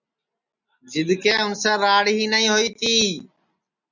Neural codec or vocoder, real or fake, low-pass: none; real; 7.2 kHz